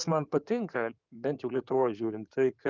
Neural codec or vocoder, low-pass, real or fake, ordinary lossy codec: codec, 16 kHz in and 24 kHz out, 2.2 kbps, FireRedTTS-2 codec; 7.2 kHz; fake; Opus, 24 kbps